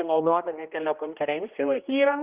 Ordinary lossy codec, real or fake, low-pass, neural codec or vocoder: Opus, 32 kbps; fake; 3.6 kHz; codec, 16 kHz, 1 kbps, X-Codec, HuBERT features, trained on general audio